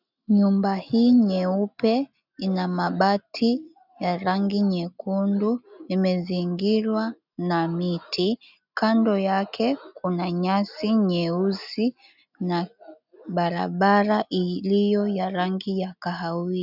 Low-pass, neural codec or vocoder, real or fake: 5.4 kHz; none; real